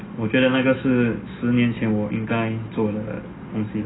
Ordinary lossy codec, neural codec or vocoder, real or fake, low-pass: AAC, 16 kbps; none; real; 7.2 kHz